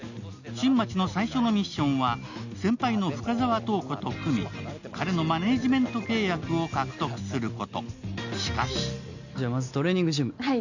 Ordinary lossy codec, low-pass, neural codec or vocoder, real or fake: none; 7.2 kHz; none; real